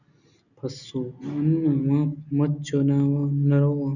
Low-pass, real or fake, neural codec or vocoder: 7.2 kHz; real; none